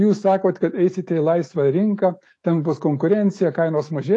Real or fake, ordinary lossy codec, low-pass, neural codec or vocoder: fake; AAC, 48 kbps; 10.8 kHz; autoencoder, 48 kHz, 128 numbers a frame, DAC-VAE, trained on Japanese speech